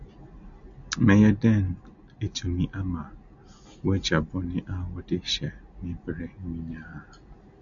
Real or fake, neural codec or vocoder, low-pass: real; none; 7.2 kHz